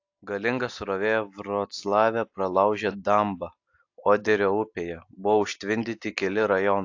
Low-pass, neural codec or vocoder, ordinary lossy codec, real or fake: 7.2 kHz; none; AAC, 48 kbps; real